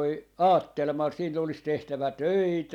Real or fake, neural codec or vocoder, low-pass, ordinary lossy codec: real; none; 19.8 kHz; none